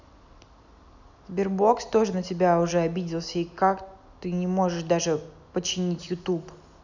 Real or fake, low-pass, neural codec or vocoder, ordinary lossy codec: real; 7.2 kHz; none; none